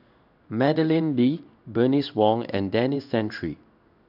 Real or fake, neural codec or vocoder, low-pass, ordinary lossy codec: fake; codec, 16 kHz in and 24 kHz out, 1 kbps, XY-Tokenizer; 5.4 kHz; none